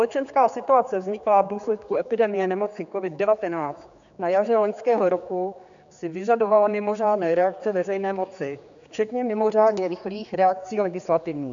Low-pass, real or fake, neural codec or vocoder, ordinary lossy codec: 7.2 kHz; fake; codec, 16 kHz, 4 kbps, X-Codec, HuBERT features, trained on general audio; AAC, 64 kbps